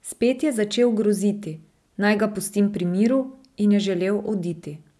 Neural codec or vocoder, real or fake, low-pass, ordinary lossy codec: none; real; none; none